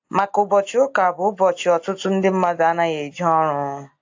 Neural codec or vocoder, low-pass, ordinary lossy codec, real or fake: none; 7.2 kHz; AAC, 48 kbps; real